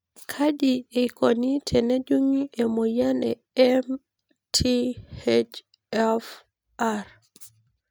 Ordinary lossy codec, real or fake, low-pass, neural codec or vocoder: none; real; none; none